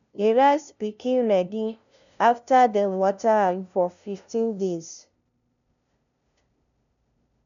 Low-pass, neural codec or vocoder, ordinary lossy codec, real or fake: 7.2 kHz; codec, 16 kHz, 0.5 kbps, FunCodec, trained on LibriTTS, 25 frames a second; none; fake